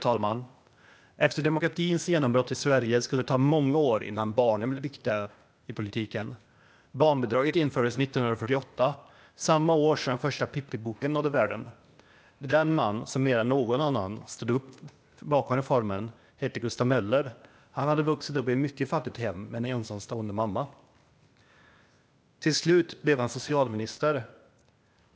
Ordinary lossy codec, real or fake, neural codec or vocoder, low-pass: none; fake; codec, 16 kHz, 0.8 kbps, ZipCodec; none